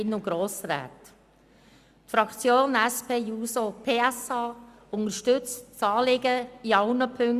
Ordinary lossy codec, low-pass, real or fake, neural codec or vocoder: Opus, 64 kbps; 14.4 kHz; real; none